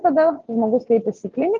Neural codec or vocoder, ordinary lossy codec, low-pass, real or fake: none; Opus, 32 kbps; 7.2 kHz; real